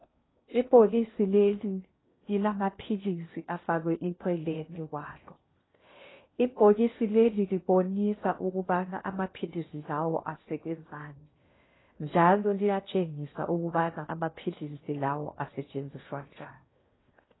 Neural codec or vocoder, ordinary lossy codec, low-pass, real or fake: codec, 16 kHz in and 24 kHz out, 0.6 kbps, FocalCodec, streaming, 2048 codes; AAC, 16 kbps; 7.2 kHz; fake